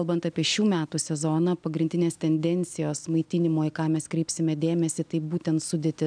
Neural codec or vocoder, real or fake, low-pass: none; real; 9.9 kHz